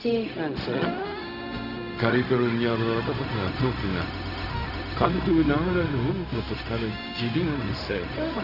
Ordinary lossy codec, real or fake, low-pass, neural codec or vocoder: none; fake; 5.4 kHz; codec, 16 kHz, 0.4 kbps, LongCat-Audio-Codec